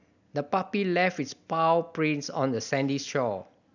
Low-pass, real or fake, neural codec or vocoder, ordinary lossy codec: 7.2 kHz; real; none; none